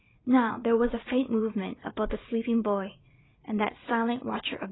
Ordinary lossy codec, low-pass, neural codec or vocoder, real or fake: AAC, 16 kbps; 7.2 kHz; vocoder, 44.1 kHz, 80 mel bands, Vocos; fake